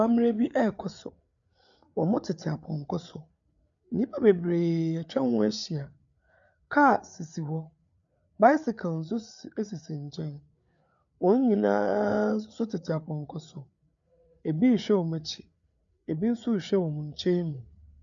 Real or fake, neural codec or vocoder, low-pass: fake; codec, 16 kHz, 8 kbps, FreqCodec, larger model; 7.2 kHz